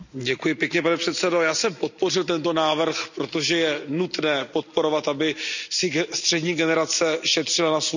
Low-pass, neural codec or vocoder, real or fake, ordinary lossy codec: 7.2 kHz; none; real; none